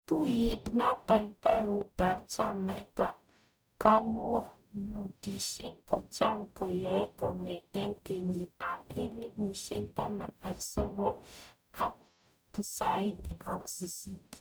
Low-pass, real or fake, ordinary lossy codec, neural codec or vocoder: none; fake; none; codec, 44.1 kHz, 0.9 kbps, DAC